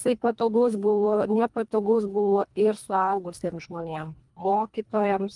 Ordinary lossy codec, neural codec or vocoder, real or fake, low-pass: Opus, 32 kbps; codec, 24 kHz, 1.5 kbps, HILCodec; fake; 10.8 kHz